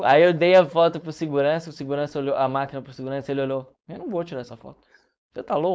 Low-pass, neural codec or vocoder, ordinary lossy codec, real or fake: none; codec, 16 kHz, 4.8 kbps, FACodec; none; fake